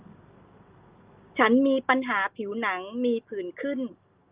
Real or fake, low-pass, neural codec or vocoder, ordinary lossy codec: real; 3.6 kHz; none; Opus, 32 kbps